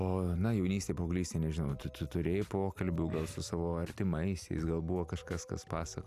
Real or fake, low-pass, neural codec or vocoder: fake; 14.4 kHz; vocoder, 44.1 kHz, 128 mel bands every 256 samples, BigVGAN v2